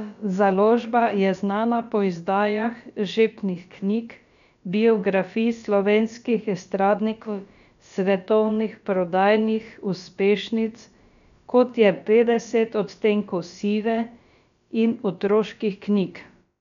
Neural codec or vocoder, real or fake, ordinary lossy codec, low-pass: codec, 16 kHz, about 1 kbps, DyCAST, with the encoder's durations; fake; none; 7.2 kHz